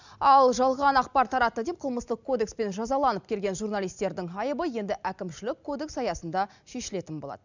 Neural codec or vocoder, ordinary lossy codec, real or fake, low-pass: none; none; real; 7.2 kHz